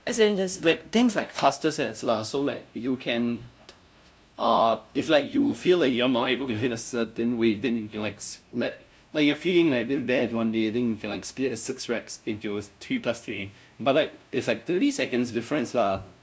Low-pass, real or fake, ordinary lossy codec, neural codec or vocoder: none; fake; none; codec, 16 kHz, 0.5 kbps, FunCodec, trained on LibriTTS, 25 frames a second